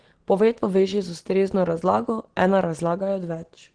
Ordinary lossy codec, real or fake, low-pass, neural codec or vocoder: Opus, 16 kbps; real; 9.9 kHz; none